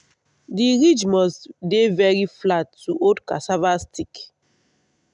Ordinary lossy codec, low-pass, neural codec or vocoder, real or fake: none; 10.8 kHz; none; real